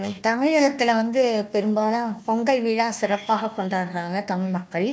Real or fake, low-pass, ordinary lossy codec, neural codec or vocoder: fake; none; none; codec, 16 kHz, 1 kbps, FunCodec, trained on Chinese and English, 50 frames a second